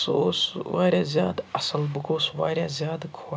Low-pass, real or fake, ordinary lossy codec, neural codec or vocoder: none; real; none; none